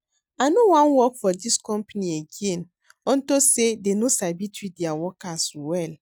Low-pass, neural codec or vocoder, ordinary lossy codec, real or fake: none; none; none; real